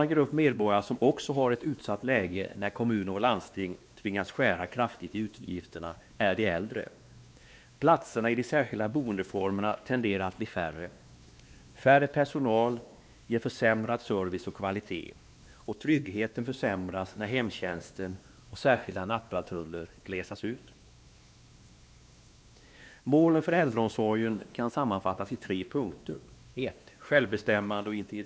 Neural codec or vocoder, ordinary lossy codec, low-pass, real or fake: codec, 16 kHz, 2 kbps, X-Codec, WavLM features, trained on Multilingual LibriSpeech; none; none; fake